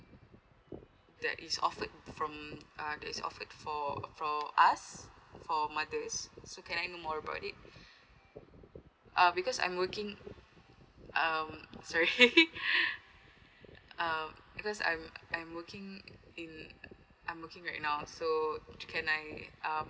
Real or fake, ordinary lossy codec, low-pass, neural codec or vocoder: real; none; none; none